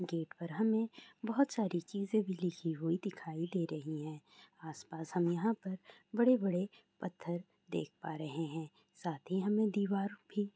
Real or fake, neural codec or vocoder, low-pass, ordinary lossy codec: real; none; none; none